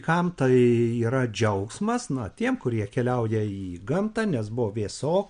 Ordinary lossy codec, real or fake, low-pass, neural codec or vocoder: MP3, 64 kbps; real; 9.9 kHz; none